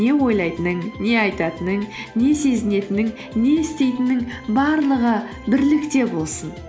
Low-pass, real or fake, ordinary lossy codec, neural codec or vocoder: none; real; none; none